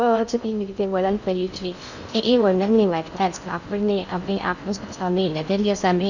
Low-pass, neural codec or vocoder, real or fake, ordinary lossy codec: 7.2 kHz; codec, 16 kHz in and 24 kHz out, 0.6 kbps, FocalCodec, streaming, 2048 codes; fake; none